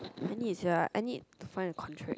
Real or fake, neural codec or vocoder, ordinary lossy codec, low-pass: real; none; none; none